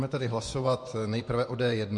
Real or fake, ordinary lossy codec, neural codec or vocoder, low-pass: fake; MP3, 48 kbps; vocoder, 24 kHz, 100 mel bands, Vocos; 10.8 kHz